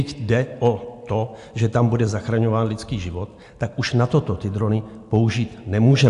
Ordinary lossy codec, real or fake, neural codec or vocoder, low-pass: AAC, 64 kbps; real; none; 10.8 kHz